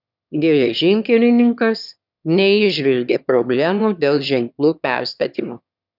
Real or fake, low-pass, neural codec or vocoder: fake; 5.4 kHz; autoencoder, 22.05 kHz, a latent of 192 numbers a frame, VITS, trained on one speaker